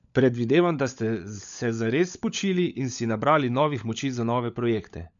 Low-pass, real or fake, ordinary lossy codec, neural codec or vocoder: 7.2 kHz; fake; MP3, 96 kbps; codec, 16 kHz, 16 kbps, FunCodec, trained on LibriTTS, 50 frames a second